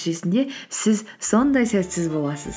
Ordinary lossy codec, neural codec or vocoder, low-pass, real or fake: none; none; none; real